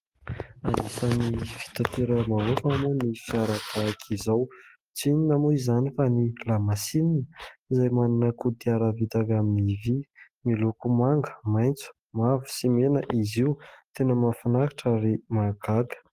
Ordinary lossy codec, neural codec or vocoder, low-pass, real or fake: Opus, 24 kbps; none; 14.4 kHz; real